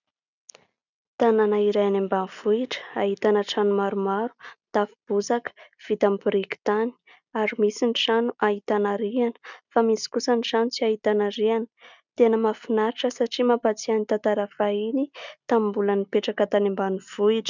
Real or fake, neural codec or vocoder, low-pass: real; none; 7.2 kHz